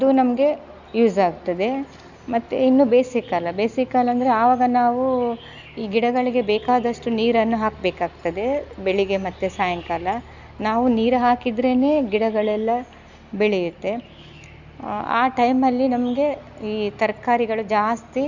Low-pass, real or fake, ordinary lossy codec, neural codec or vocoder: 7.2 kHz; real; none; none